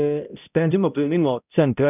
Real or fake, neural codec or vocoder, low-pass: fake; codec, 16 kHz, 0.5 kbps, X-Codec, HuBERT features, trained on balanced general audio; 3.6 kHz